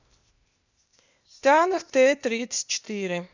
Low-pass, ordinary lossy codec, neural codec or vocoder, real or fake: 7.2 kHz; none; codec, 16 kHz, 0.8 kbps, ZipCodec; fake